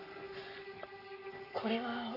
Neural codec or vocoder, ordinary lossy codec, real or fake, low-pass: codec, 44.1 kHz, 7.8 kbps, Pupu-Codec; Opus, 64 kbps; fake; 5.4 kHz